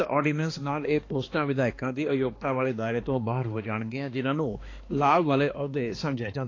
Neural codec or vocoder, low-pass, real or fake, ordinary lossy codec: codec, 16 kHz, 2 kbps, X-Codec, HuBERT features, trained on balanced general audio; 7.2 kHz; fake; AAC, 32 kbps